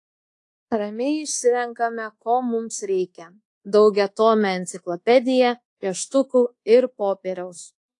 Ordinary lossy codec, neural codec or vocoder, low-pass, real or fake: AAC, 48 kbps; codec, 24 kHz, 1.2 kbps, DualCodec; 10.8 kHz; fake